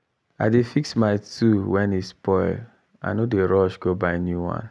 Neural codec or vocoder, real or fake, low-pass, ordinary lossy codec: none; real; none; none